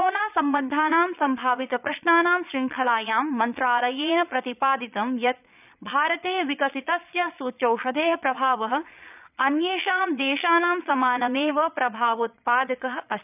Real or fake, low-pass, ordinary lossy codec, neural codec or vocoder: fake; 3.6 kHz; none; vocoder, 22.05 kHz, 80 mel bands, Vocos